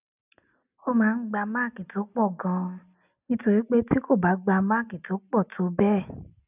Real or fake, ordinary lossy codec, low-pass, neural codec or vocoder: real; none; 3.6 kHz; none